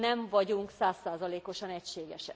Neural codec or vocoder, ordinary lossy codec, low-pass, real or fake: none; none; none; real